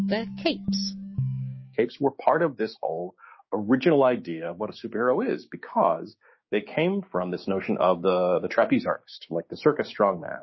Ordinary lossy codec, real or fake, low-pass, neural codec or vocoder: MP3, 24 kbps; fake; 7.2 kHz; codec, 16 kHz, 6 kbps, DAC